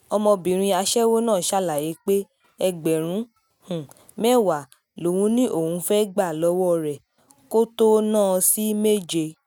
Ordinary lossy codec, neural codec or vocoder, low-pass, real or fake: none; none; 19.8 kHz; real